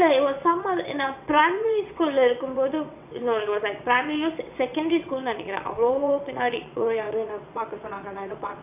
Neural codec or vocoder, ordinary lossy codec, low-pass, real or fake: vocoder, 22.05 kHz, 80 mel bands, WaveNeXt; none; 3.6 kHz; fake